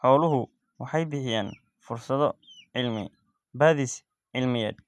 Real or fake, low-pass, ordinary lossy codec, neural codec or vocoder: real; none; none; none